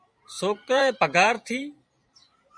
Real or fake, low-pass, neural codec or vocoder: fake; 9.9 kHz; vocoder, 44.1 kHz, 128 mel bands every 512 samples, BigVGAN v2